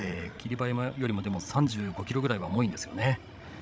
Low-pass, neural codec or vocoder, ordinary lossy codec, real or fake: none; codec, 16 kHz, 16 kbps, FreqCodec, larger model; none; fake